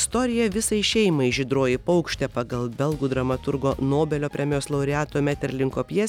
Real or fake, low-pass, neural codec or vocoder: real; 19.8 kHz; none